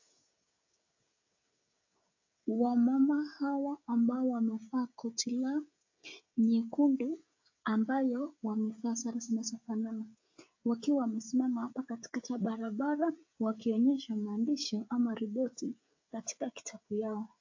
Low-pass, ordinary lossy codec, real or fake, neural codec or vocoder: 7.2 kHz; AAC, 48 kbps; fake; codec, 16 kHz, 16 kbps, FreqCodec, smaller model